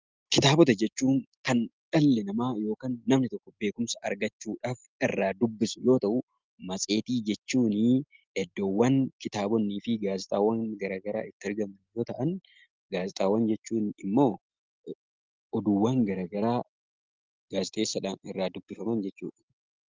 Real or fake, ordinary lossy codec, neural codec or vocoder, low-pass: real; Opus, 32 kbps; none; 7.2 kHz